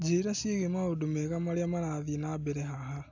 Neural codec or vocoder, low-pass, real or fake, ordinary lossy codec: none; 7.2 kHz; real; AAC, 48 kbps